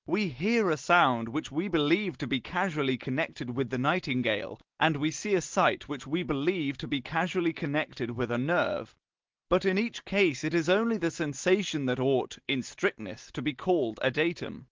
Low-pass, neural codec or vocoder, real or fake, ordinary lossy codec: 7.2 kHz; none; real; Opus, 16 kbps